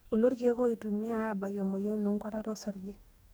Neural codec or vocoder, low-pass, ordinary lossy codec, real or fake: codec, 44.1 kHz, 2.6 kbps, DAC; none; none; fake